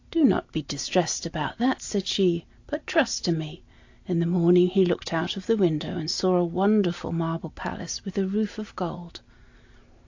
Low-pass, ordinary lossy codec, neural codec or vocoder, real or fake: 7.2 kHz; AAC, 48 kbps; none; real